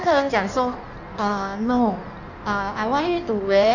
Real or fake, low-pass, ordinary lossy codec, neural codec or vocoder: fake; 7.2 kHz; none; codec, 16 kHz in and 24 kHz out, 1.1 kbps, FireRedTTS-2 codec